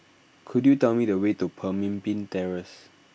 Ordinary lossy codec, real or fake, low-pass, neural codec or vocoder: none; real; none; none